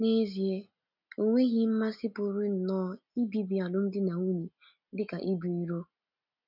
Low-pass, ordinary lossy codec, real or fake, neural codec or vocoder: 5.4 kHz; none; real; none